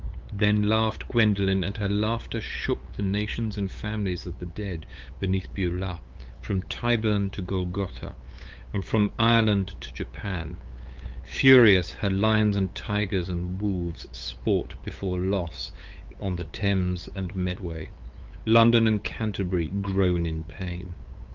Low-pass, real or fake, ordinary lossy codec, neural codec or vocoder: 7.2 kHz; fake; Opus, 24 kbps; codec, 16 kHz, 8 kbps, FunCodec, trained on LibriTTS, 25 frames a second